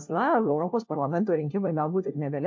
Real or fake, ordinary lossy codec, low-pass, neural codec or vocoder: fake; MP3, 48 kbps; 7.2 kHz; codec, 16 kHz, 1 kbps, FunCodec, trained on LibriTTS, 50 frames a second